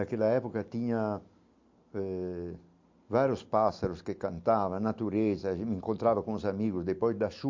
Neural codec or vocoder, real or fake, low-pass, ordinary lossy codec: none; real; 7.2 kHz; MP3, 48 kbps